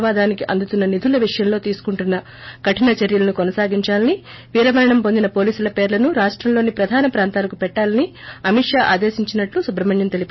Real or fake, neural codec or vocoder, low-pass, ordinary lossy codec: real; none; 7.2 kHz; MP3, 24 kbps